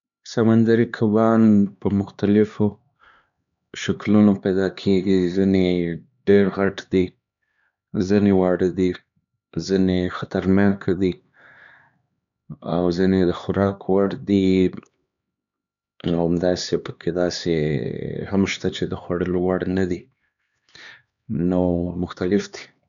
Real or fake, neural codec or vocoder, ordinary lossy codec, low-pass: fake; codec, 16 kHz, 2 kbps, X-Codec, HuBERT features, trained on LibriSpeech; none; 7.2 kHz